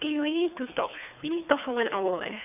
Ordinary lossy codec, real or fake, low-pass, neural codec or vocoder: none; fake; 3.6 kHz; codec, 24 kHz, 3 kbps, HILCodec